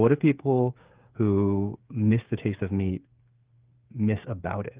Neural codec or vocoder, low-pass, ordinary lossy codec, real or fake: codec, 16 kHz, 8 kbps, FreqCodec, smaller model; 3.6 kHz; Opus, 32 kbps; fake